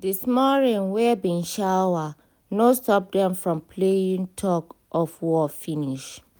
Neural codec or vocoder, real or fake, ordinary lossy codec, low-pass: none; real; none; none